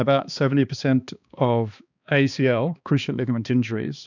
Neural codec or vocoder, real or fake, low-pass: codec, 16 kHz, 2 kbps, X-Codec, HuBERT features, trained on balanced general audio; fake; 7.2 kHz